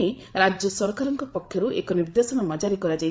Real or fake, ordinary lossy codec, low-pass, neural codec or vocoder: fake; none; none; codec, 16 kHz, 8 kbps, FreqCodec, larger model